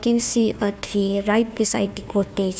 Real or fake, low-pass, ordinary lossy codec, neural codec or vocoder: fake; none; none; codec, 16 kHz, 1 kbps, FunCodec, trained on Chinese and English, 50 frames a second